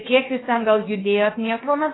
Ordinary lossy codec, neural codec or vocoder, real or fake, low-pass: AAC, 16 kbps; codec, 16 kHz, about 1 kbps, DyCAST, with the encoder's durations; fake; 7.2 kHz